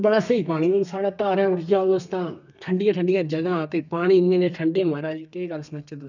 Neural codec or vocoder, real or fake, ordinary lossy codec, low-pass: codec, 32 kHz, 1.9 kbps, SNAC; fake; none; 7.2 kHz